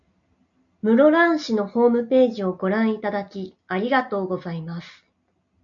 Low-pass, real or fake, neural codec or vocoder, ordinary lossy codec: 7.2 kHz; real; none; AAC, 64 kbps